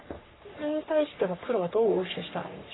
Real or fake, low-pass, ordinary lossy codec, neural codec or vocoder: fake; 7.2 kHz; AAC, 16 kbps; codec, 44.1 kHz, 3.4 kbps, Pupu-Codec